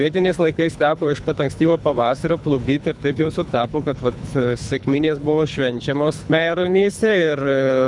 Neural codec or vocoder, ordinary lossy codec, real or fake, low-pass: codec, 24 kHz, 3 kbps, HILCodec; MP3, 96 kbps; fake; 10.8 kHz